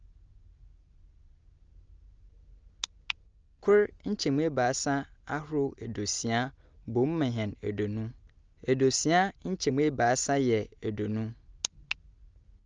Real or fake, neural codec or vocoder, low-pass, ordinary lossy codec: real; none; 7.2 kHz; Opus, 24 kbps